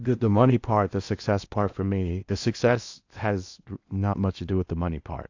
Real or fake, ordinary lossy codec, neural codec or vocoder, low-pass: fake; AAC, 48 kbps; codec, 16 kHz in and 24 kHz out, 0.8 kbps, FocalCodec, streaming, 65536 codes; 7.2 kHz